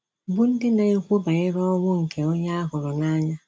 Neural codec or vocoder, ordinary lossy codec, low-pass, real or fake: none; none; none; real